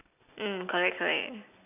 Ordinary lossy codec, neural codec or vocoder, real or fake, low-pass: none; none; real; 3.6 kHz